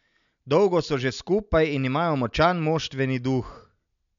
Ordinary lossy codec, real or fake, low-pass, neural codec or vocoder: none; real; 7.2 kHz; none